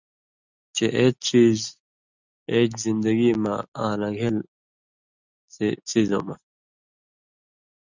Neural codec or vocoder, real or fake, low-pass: none; real; 7.2 kHz